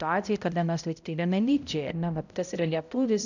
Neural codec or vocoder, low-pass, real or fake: codec, 16 kHz, 0.5 kbps, X-Codec, HuBERT features, trained on balanced general audio; 7.2 kHz; fake